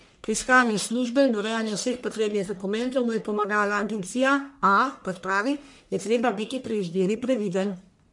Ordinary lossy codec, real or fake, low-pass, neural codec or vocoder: MP3, 64 kbps; fake; 10.8 kHz; codec, 44.1 kHz, 1.7 kbps, Pupu-Codec